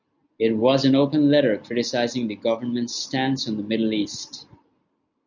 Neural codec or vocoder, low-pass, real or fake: none; 7.2 kHz; real